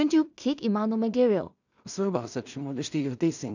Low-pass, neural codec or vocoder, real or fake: 7.2 kHz; codec, 16 kHz in and 24 kHz out, 0.4 kbps, LongCat-Audio-Codec, two codebook decoder; fake